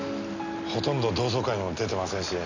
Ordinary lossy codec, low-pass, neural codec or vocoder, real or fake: none; 7.2 kHz; none; real